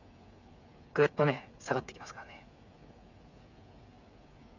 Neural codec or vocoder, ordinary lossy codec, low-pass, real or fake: codec, 16 kHz, 8 kbps, FreqCodec, smaller model; none; 7.2 kHz; fake